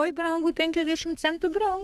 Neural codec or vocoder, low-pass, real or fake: codec, 32 kHz, 1.9 kbps, SNAC; 14.4 kHz; fake